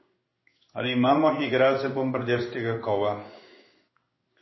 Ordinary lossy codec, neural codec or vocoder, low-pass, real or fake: MP3, 24 kbps; codec, 16 kHz in and 24 kHz out, 1 kbps, XY-Tokenizer; 7.2 kHz; fake